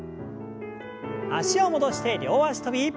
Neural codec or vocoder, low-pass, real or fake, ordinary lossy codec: none; none; real; none